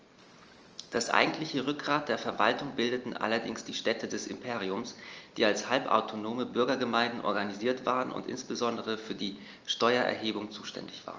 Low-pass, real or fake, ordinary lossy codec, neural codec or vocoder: 7.2 kHz; real; Opus, 24 kbps; none